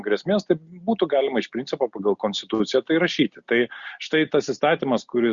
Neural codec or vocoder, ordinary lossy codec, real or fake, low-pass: none; Opus, 64 kbps; real; 7.2 kHz